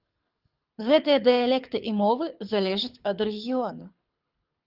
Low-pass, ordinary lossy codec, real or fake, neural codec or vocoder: 5.4 kHz; Opus, 24 kbps; fake; codec, 24 kHz, 6 kbps, HILCodec